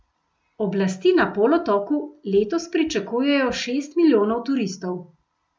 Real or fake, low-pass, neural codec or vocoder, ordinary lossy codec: real; none; none; none